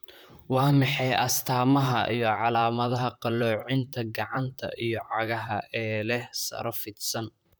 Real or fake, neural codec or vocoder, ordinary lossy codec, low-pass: fake; vocoder, 44.1 kHz, 128 mel bands, Pupu-Vocoder; none; none